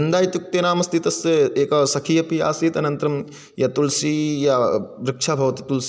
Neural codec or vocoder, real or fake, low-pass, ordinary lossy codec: none; real; none; none